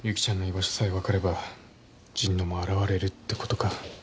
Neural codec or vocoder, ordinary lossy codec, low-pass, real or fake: none; none; none; real